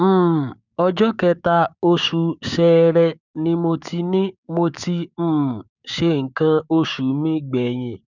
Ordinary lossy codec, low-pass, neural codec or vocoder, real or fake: none; 7.2 kHz; codec, 16 kHz, 6 kbps, DAC; fake